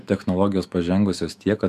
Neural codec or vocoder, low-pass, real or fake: autoencoder, 48 kHz, 128 numbers a frame, DAC-VAE, trained on Japanese speech; 14.4 kHz; fake